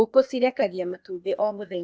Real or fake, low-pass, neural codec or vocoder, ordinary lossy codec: fake; none; codec, 16 kHz, 0.8 kbps, ZipCodec; none